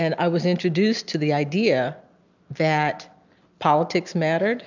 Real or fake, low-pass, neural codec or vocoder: real; 7.2 kHz; none